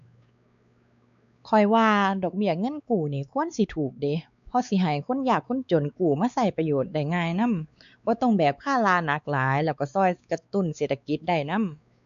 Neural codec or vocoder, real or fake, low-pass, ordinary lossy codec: codec, 16 kHz, 4 kbps, X-Codec, WavLM features, trained on Multilingual LibriSpeech; fake; 7.2 kHz; none